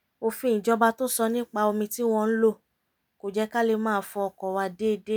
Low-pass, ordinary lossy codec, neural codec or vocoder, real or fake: none; none; none; real